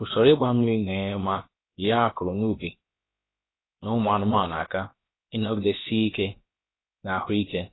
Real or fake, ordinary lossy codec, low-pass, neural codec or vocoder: fake; AAC, 16 kbps; 7.2 kHz; codec, 16 kHz, about 1 kbps, DyCAST, with the encoder's durations